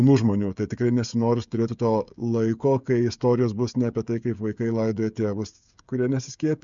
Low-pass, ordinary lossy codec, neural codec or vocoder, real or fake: 7.2 kHz; MP3, 64 kbps; codec, 16 kHz, 16 kbps, FreqCodec, smaller model; fake